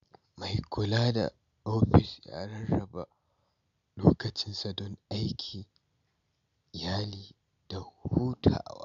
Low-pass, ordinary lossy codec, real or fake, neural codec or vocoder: 7.2 kHz; none; real; none